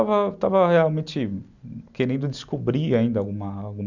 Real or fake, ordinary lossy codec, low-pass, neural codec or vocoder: real; none; 7.2 kHz; none